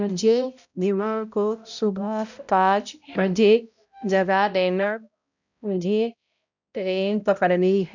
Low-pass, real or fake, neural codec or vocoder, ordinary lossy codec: 7.2 kHz; fake; codec, 16 kHz, 0.5 kbps, X-Codec, HuBERT features, trained on balanced general audio; none